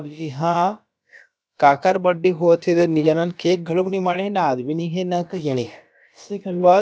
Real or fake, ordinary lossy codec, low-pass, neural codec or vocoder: fake; none; none; codec, 16 kHz, about 1 kbps, DyCAST, with the encoder's durations